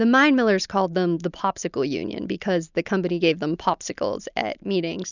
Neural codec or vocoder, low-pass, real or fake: none; 7.2 kHz; real